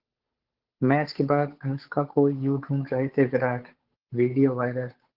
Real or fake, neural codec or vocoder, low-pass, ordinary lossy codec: fake; codec, 16 kHz, 8 kbps, FunCodec, trained on Chinese and English, 25 frames a second; 5.4 kHz; Opus, 16 kbps